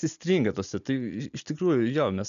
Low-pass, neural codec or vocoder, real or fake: 7.2 kHz; none; real